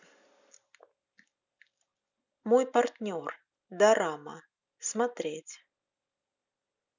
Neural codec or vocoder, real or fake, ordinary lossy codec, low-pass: none; real; none; 7.2 kHz